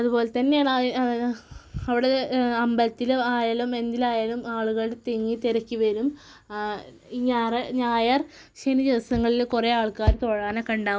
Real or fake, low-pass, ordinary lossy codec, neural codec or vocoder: real; none; none; none